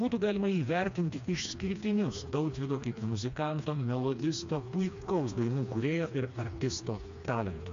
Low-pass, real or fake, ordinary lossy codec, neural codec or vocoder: 7.2 kHz; fake; MP3, 64 kbps; codec, 16 kHz, 2 kbps, FreqCodec, smaller model